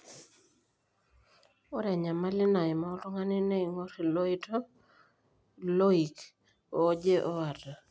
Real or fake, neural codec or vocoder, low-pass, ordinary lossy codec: real; none; none; none